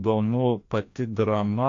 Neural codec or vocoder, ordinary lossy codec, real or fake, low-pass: codec, 16 kHz, 1 kbps, FreqCodec, larger model; AAC, 48 kbps; fake; 7.2 kHz